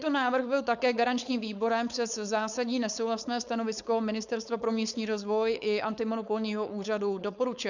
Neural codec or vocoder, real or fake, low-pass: codec, 16 kHz, 4.8 kbps, FACodec; fake; 7.2 kHz